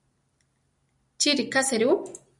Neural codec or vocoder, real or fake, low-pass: none; real; 10.8 kHz